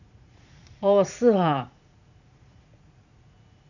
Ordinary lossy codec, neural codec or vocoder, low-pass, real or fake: none; vocoder, 44.1 kHz, 80 mel bands, Vocos; 7.2 kHz; fake